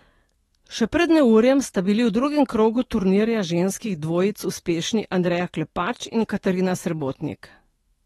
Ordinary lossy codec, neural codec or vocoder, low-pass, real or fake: AAC, 32 kbps; autoencoder, 48 kHz, 128 numbers a frame, DAC-VAE, trained on Japanese speech; 19.8 kHz; fake